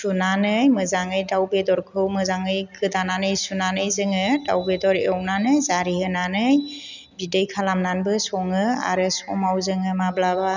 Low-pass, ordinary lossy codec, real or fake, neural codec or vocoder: 7.2 kHz; none; real; none